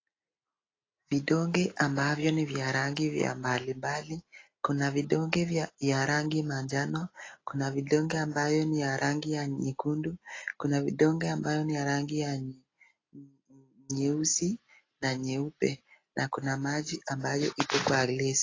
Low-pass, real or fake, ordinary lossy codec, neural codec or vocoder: 7.2 kHz; real; AAC, 32 kbps; none